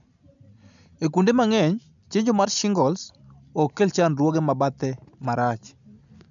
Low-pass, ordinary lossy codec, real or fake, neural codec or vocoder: 7.2 kHz; none; real; none